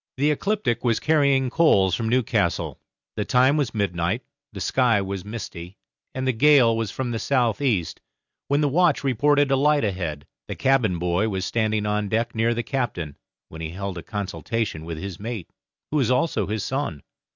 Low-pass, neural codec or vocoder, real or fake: 7.2 kHz; none; real